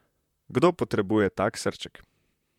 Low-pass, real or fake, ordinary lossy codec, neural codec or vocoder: 19.8 kHz; fake; MP3, 96 kbps; vocoder, 44.1 kHz, 128 mel bands, Pupu-Vocoder